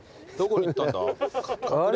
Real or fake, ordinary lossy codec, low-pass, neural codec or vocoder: real; none; none; none